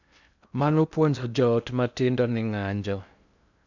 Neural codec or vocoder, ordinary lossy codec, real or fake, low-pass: codec, 16 kHz in and 24 kHz out, 0.6 kbps, FocalCodec, streaming, 2048 codes; none; fake; 7.2 kHz